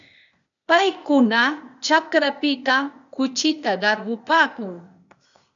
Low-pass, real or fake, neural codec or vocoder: 7.2 kHz; fake; codec, 16 kHz, 0.8 kbps, ZipCodec